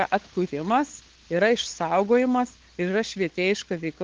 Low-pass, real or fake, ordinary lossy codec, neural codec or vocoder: 7.2 kHz; fake; Opus, 16 kbps; codec, 16 kHz, 8 kbps, FunCodec, trained on LibriTTS, 25 frames a second